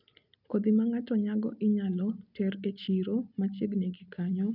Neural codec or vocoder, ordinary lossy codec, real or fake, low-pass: none; none; real; 5.4 kHz